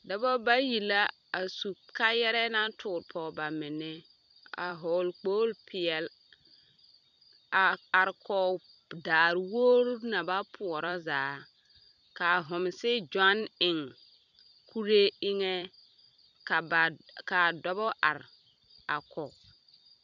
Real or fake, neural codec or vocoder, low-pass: fake; vocoder, 44.1 kHz, 128 mel bands every 256 samples, BigVGAN v2; 7.2 kHz